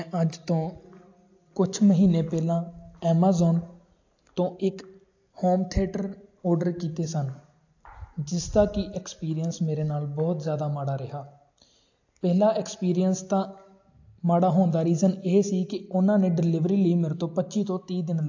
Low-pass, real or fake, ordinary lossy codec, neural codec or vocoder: 7.2 kHz; real; AAC, 48 kbps; none